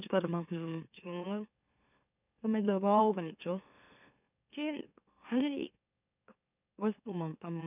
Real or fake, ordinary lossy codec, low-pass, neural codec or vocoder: fake; none; 3.6 kHz; autoencoder, 44.1 kHz, a latent of 192 numbers a frame, MeloTTS